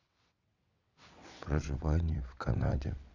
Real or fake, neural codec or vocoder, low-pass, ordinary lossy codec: fake; vocoder, 22.05 kHz, 80 mel bands, WaveNeXt; 7.2 kHz; none